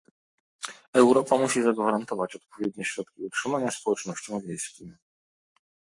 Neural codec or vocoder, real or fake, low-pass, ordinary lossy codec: codec, 44.1 kHz, 7.8 kbps, Pupu-Codec; fake; 10.8 kHz; MP3, 48 kbps